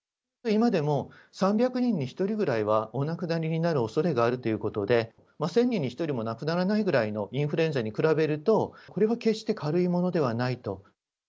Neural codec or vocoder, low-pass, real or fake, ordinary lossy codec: none; none; real; none